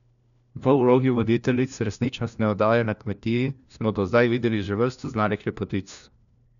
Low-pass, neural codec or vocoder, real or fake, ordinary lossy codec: 7.2 kHz; codec, 16 kHz, 1 kbps, FunCodec, trained on LibriTTS, 50 frames a second; fake; none